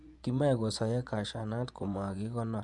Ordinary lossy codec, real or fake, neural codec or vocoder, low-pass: none; fake; vocoder, 24 kHz, 100 mel bands, Vocos; 10.8 kHz